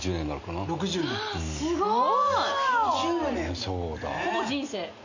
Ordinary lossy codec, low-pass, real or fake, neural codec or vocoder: none; 7.2 kHz; real; none